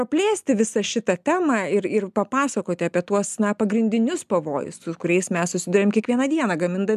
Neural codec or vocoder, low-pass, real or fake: none; 14.4 kHz; real